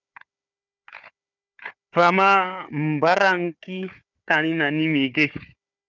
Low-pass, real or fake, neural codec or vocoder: 7.2 kHz; fake; codec, 16 kHz, 4 kbps, FunCodec, trained on Chinese and English, 50 frames a second